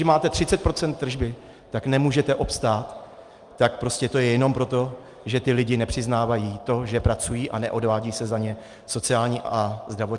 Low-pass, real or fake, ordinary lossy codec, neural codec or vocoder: 10.8 kHz; real; Opus, 32 kbps; none